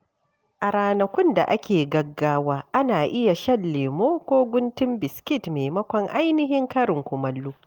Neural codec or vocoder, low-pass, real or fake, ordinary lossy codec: none; 19.8 kHz; real; Opus, 24 kbps